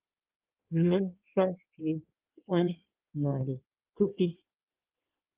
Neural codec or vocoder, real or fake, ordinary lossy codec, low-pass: codec, 16 kHz in and 24 kHz out, 1.1 kbps, FireRedTTS-2 codec; fake; Opus, 24 kbps; 3.6 kHz